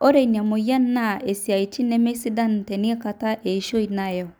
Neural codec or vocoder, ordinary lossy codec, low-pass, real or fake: none; none; none; real